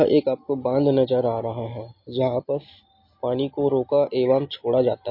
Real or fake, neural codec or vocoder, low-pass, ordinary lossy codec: real; none; 5.4 kHz; MP3, 32 kbps